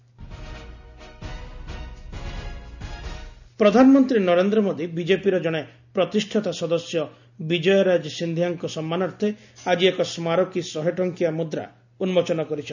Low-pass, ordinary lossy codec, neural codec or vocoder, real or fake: 7.2 kHz; none; none; real